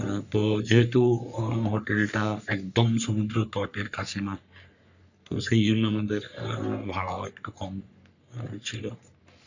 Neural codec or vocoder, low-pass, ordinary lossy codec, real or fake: codec, 44.1 kHz, 3.4 kbps, Pupu-Codec; 7.2 kHz; none; fake